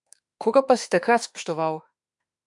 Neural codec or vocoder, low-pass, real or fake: autoencoder, 48 kHz, 32 numbers a frame, DAC-VAE, trained on Japanese speech; 10.8 kHz; fake